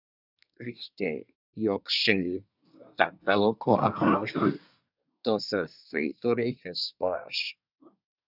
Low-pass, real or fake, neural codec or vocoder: 5.4 kHz; fake; codec, 24 kHz, 1 kbps, SNAC